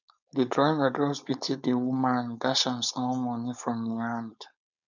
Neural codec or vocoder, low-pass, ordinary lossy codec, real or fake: codec, 16 kHz, 4.8 kbps, FACodec; 7.2 kHz; none; fake